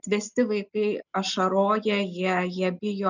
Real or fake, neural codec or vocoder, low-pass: real; none; 7.2 kHz